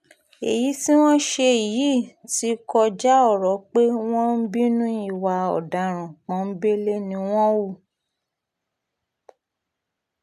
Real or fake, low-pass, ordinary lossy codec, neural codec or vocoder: real; 14.4 kHz; none; none